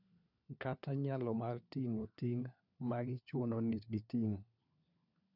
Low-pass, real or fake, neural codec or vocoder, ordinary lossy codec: 5.4 kHz; fake; codec, 16 kHz, 4 kbps, FunCodec, trained on LibriTTS, 50 frames a second; none